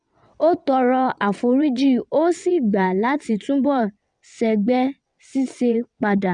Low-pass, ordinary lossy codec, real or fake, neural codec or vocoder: 9.9 kHz; none; fake; vocoder, 22.05 kHz, 80 mel bands, WaveNeXt